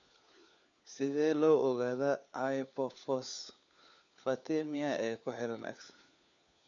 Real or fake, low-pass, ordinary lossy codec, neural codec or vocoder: fake; 7.2 kHz; none; codec, 16 kHz, 4 kbps, FunCodec, trained on LibriTTS, 50 frames a second